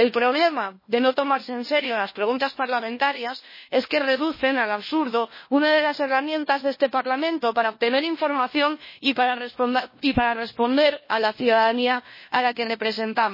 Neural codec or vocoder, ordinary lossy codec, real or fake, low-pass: codec, 16 kHz, 1 kbps, FunCodec, trained on LibriTTS, 50 frames a second; MP3, 24 kbps; fake; 5.4 kHz